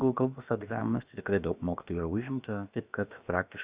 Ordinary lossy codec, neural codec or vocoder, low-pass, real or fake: Opus, 64 kbps; codec, 16 kHz, about 1 kbps, DyCAST, with the encoder's durations; 3.6 kHz; fake